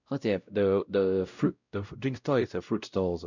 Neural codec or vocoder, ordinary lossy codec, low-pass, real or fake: codec, 16 kHz, 0.5 kbps, X-Codec, WavLM features, trained on Multilingual LibriSpeech; none; 7.2 kHz; fake